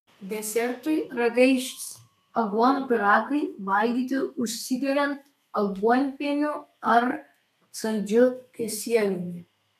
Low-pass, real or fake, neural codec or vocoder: 14.4 kHz; fake; codec, 32 kHz, 1.9 kbps, SNAC